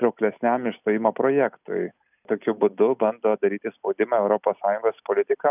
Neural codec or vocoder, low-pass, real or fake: none; 3.6 kHz; real